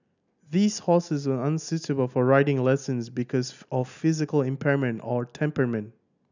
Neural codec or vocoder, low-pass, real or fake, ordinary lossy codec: none; 7.2 kHz; real; none